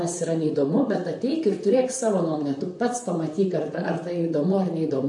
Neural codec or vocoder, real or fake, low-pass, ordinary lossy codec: vocoder, 44.1 kHz, 128 mel bands, Pupu-Vocoder; fake; 10.8 kHz; AAC, 64 kbps